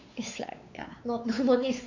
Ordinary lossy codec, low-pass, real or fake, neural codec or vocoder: none; 7.2 kHz; fake; codec, 16 kHz, 4 kbps, X-Codec, WavLM features, trained on Multilingual LibriSpeech